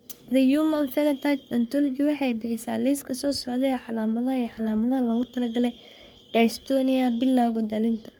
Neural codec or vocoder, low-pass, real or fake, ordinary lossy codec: codec, 44.1 kHz, 3.4 kbps, Pupu-Codec; none; fake; none